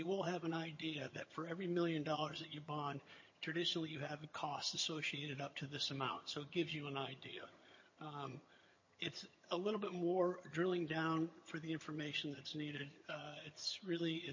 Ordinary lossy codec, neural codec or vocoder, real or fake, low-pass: MP3, 32 kbps; vocoder, 22.05 kHz, 80 mel bands, HiFi-GAN; fake; 7.2 kHz